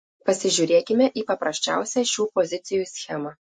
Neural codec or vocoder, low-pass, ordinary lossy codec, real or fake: none; 10.8 kHz; MP3, 48 kbps; real